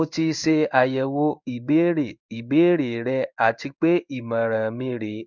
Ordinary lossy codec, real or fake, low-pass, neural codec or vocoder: none; fake; 7.2 kHz; codec, 16 kHz in and 24 kHz out, 1 kbps, XY-Tokenizer